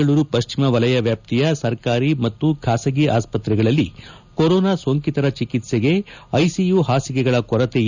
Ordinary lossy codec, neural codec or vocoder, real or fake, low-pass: none; none; real; 7.2 kHz